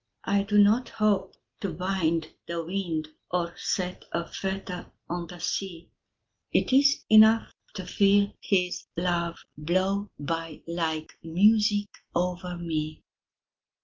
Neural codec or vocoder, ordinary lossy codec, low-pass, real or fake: none; Opus, 32 kbps; 7.2 kHz; real